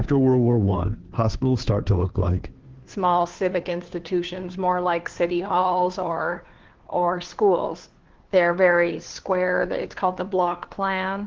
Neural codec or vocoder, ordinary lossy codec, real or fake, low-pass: codec, 16 kHz, 2 kbps, FunCodec, trained on Chinese and English, 25 frames a second; Opus, 16 kbps; fake; 7.2 kHz